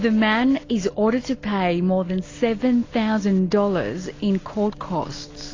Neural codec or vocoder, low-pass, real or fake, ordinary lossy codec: none; 7.2 kHz; real; AAC, 32 kbps